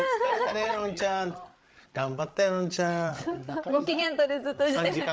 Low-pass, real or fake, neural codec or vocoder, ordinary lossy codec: none; fake; codec, 16 kHz, 8 kbps, FreqCodec, larger model; none